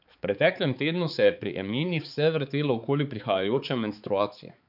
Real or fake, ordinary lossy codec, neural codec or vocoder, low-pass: fake; none; codec, 16 kHz, 4 kbps, X-Codec, HuBERT features, trained on balanced general audio; 5.4 kHz